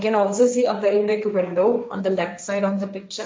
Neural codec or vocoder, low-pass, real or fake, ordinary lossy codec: codec, 16 kHz, 1.1 kbps, Voila-Tokenizer; none; fake; none